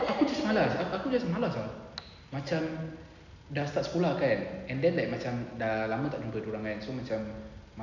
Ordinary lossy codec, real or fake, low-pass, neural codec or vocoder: none; real; 7.2 kHz; none